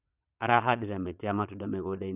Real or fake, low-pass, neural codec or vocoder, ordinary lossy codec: fake; 3.6 kHz; vocoder, 22.05 kHz, 80 mel bands, Vocos; none